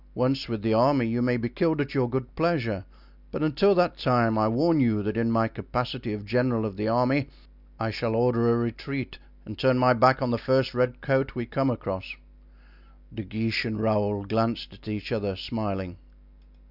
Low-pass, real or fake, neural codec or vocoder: 5.4 kHz; real; none